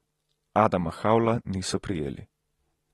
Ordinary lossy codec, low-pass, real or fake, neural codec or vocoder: AAC, 32 kbps; 19.8 kHz; real; none